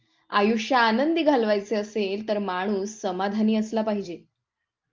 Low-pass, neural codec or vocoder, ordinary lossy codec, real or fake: 7.2 kHz; none; Opus, 24 kbps; real